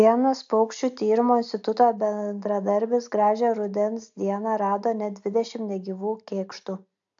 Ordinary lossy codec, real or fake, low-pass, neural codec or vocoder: AAC, 64 kbps; real; 7.2 kHz; none